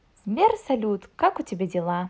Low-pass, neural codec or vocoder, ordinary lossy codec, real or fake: none; none; none; real